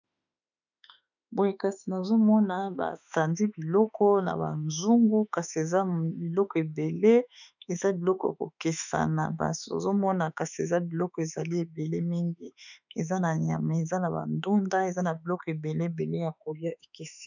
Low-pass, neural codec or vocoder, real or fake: 7.2 kHz; autoencoder, 48 kHz, 32 numbers a frame, DAC-VAE, trained on Japanese speech; fake